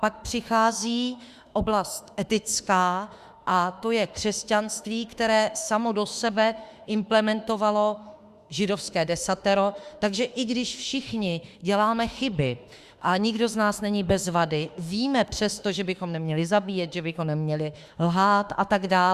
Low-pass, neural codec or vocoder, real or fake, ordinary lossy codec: 14.4 kHz; autoencoder, 48 kHz, 32 numbers a frame, DAC-VAE, trained on Japanese speech; fake; Opus, 64 kbps